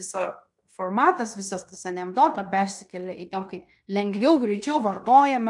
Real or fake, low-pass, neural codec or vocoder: fake; 10.8 kHz; codec, 16 kHz in and 24 kHz out, 0.9 kbps, LongCat-Audio-Codec, fine tuned four codebook decoder